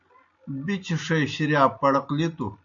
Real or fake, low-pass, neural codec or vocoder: real; 7.2 kHz; none